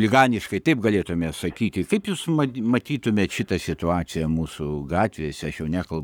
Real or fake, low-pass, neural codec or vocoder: fake; 19.8 kHz; codec, 44.1 kHz, 7.8 kbps, Pupu-Codec